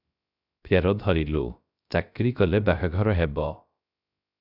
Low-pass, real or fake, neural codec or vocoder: 5.4 kHz; fake; codec, 16 kHz, 0.3 kbps, FocalCodec